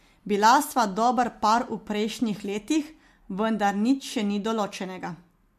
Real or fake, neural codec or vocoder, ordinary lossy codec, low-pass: real; none; MP3, 64 kbps; 14.4 kHz